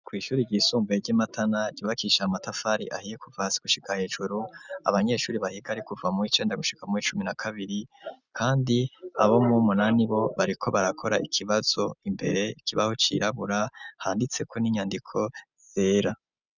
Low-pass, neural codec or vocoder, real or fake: 7.2 kHz; none; real